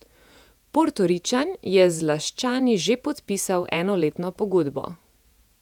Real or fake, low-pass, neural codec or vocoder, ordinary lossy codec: fake; 19.8 kHz; vocoder, 48 kHz, 128 mel bands, Vocos; none